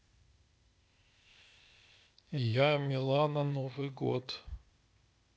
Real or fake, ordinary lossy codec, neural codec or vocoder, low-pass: fake; none; codec, 16 kHz, 0.8 kbps, ZipCodec; none